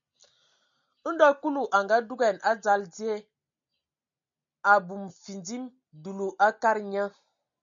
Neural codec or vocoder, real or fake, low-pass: none; real; 7.2 kHz